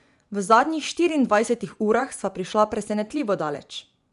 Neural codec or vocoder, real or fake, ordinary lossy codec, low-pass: vocoder, 24 kHz, 100 mel bands, Vocos; fake; none; 10.8 kHz